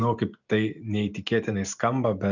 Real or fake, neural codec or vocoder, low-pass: real; none; 7.2 kHz